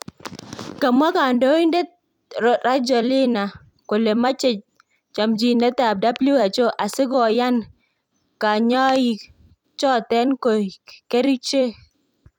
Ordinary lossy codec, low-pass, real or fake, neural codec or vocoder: none; 19.8 kHz; fake; vocoder, 44.1 kHz, 128 mel bands every 256 samples, BigVGAN v2